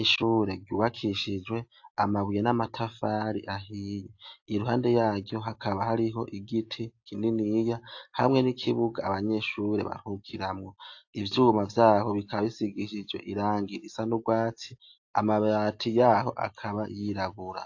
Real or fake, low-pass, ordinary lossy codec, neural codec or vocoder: real; 7.2 kHz; AAC, 48 kbps; none